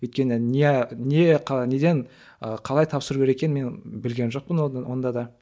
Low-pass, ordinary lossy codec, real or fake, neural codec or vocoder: none; none; real; none